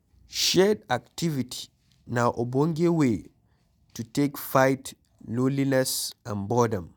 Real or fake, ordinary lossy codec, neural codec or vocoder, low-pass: real; none; none; none